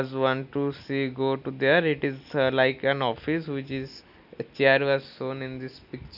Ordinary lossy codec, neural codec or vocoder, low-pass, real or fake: none; none; 5.4 kHz; real